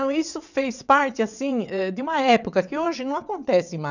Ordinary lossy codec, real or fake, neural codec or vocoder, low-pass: none; fake; vocoder, 22.05 kHz, 80 mel bands, WaveNeXt; 7.2 kHz